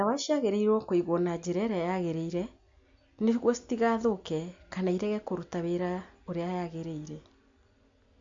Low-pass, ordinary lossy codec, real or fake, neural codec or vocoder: 7.2 kHz; MP3, 48 kbps; real; none